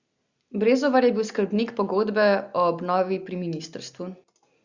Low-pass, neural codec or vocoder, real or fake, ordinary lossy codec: 7.2 kHz; none; real; Opus, 64 kbps